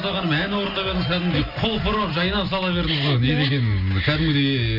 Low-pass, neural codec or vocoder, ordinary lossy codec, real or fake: 5.4 kHz; none; none; real